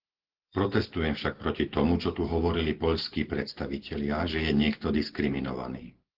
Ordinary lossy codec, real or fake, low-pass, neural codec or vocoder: Opus, 16 kbps; real; 5.4 kHz; none